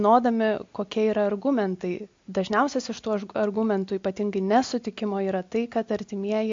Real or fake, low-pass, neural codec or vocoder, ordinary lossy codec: real; 7.2 kHz; none; MP3, 48 kbps